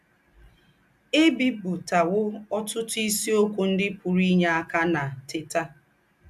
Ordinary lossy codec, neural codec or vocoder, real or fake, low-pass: AAC, 96 kbps; none; real; 14.4 kHz